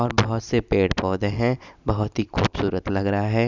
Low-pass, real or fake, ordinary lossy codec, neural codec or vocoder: 7.2 kHz; real; none; none